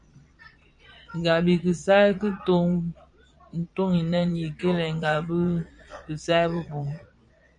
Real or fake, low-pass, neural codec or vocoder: fake; 9.9 kHz; vocoder, 22.05 kHz, 80 mel bands, Vocos